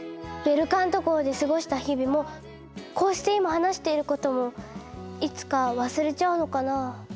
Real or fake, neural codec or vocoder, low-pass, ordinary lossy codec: real; none; none; none